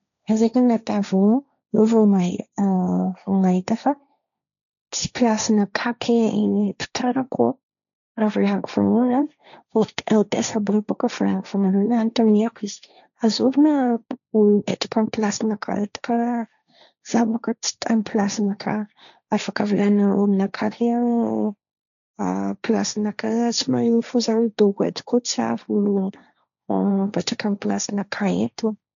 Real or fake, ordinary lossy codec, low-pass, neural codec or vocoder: fake; none; 7.2 kHz; codec, 16 kHz, 1.1 kbps, Voila-Tokenizer